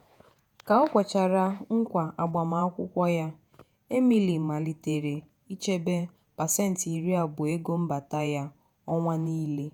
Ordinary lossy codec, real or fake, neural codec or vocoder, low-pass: none; fake; vocoder, 48 kHz, 128 mel bands, Vocos; none